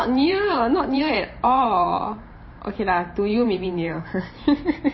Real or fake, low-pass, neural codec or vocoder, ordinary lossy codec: fake; 7.2 kHz; vocoder, 44.1 kHz, 128 mel bands every 512 samples, BigVGAN v2; MP3, 24 kbps